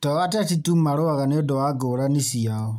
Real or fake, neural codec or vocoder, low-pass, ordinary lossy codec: real; none; 19.8 kHz; MP3, 96 kbps